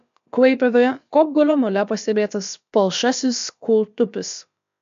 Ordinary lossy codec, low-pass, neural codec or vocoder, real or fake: MP3, 64 kbps; 7.2 kHz; codec, 16 kHz, about 1 kbps, DyCAST, with the encoder's durations; fake